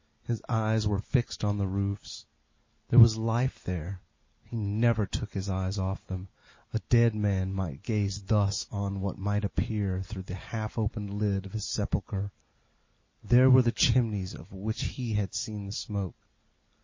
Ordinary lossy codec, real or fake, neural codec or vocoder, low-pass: MP3, 32 kbps; real; none; 7.2 kHz